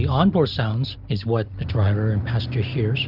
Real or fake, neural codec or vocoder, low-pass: real; none; 5.4 kHz